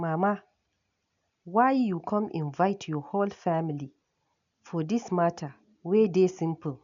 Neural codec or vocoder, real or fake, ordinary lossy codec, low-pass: none; real; none; 7.2 kHz